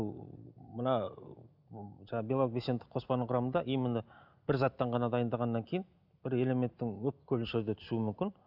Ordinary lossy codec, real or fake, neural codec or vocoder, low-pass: none; real; none; 5.4 kHz